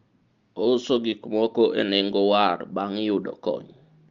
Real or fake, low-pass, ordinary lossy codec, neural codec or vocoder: real; 7.2 kHz; Opus, 32 kbps; none